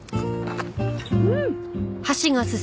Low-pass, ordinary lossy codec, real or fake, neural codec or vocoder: none; none; real; none